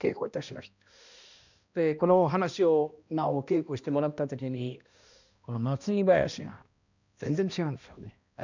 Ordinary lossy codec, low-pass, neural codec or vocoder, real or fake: none; 7.2 kHz; codec, 16 kHz, 1 kbps, X-Codec, HuBERT features, trained on balanced general audio; fake